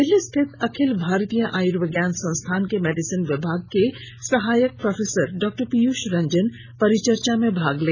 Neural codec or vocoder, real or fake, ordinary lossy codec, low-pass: none; real; none; none